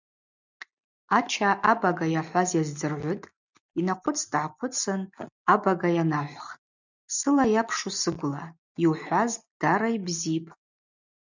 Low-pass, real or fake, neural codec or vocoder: 7.2 kHz; real; none